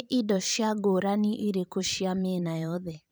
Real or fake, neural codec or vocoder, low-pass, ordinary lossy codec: fake; vocoder, 44.1 kHz, 128 mel bands every 512 samples, BigVGAN v2; none; none